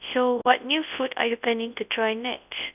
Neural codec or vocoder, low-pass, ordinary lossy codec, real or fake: codec, 24 kHz, 0.9 kbps, WavTokenizer, large speech release; 3.6 kHz; none; fake